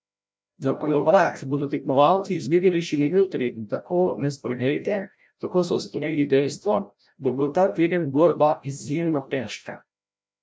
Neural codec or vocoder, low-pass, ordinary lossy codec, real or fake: codec, 16 kHz, 0.5 kbps, FreqCodec, larger model; none; none; fake